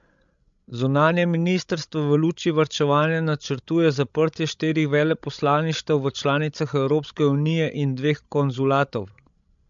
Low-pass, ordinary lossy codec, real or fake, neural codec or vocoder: 7.2 kHz; MP3, 64 kbps; fake; codec, 16 kHz, 16 kbps, FreqCodec, larger model